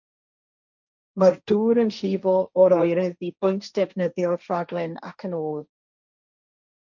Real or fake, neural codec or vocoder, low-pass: fake; codec, 16 kHz, 1.1 kbps, Voila-Tokenizer; 7.2 kHz